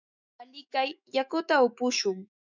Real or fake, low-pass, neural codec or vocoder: fake; 7.2 kHz; autoencoder, 48 kHz, 128 numbers a frame, DAC-VAE, trained on Japanese speech